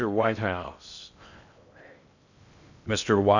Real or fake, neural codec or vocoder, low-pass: fake; codec, 16 kHz in and 24 kHz out, 0.6 kbps, FocalCodec, streaming, 2048 codes; 7.2 kHz